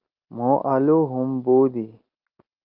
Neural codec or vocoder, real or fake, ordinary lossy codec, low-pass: none; real; Opus, 32 kbps; 5.4 kHz